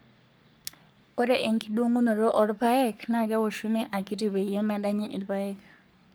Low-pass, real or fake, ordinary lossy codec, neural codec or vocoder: none; fake; none; codec, 44.1 kHz, 3.4 kbps, Pupu-Codec